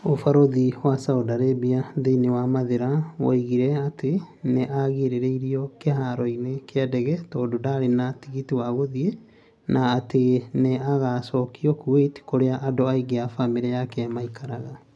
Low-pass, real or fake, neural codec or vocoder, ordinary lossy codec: none; real; none; none